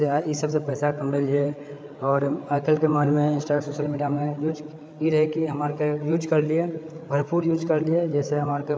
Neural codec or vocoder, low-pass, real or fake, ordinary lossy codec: codec, 16 kHz, 8 kbps, FreqCodec, larger model; none; fake; none